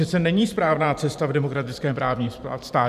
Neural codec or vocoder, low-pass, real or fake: vocoder, 44.1 kHz, 128 mel bands every 512 samples, BigVGAN v2; 14.4 kHz; fake